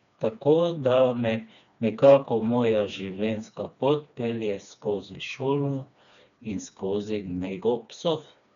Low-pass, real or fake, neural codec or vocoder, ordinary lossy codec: 7.2 kHz; fake; codec, 16 kHz, 2 kbps, FreqCodec, smaller model; none